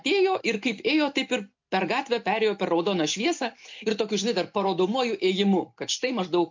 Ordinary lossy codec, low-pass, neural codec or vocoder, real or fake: MP3, 48 kbps; 7.2 kHz; none; real